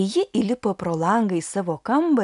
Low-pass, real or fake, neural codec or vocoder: 10.8 kHz; real; none